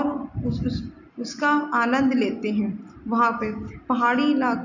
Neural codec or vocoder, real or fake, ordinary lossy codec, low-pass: none; real; none; 7.2 kHz